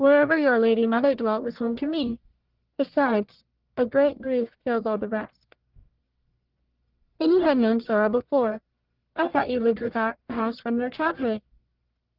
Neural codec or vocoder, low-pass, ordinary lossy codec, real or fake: codec, 44.1 kHz, 1.7 kbps, Pupu-Codec; 5.4 kHz; Opus, 16 kbps; fake